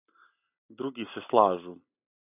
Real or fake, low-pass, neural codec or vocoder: real; 3.6 kHz; none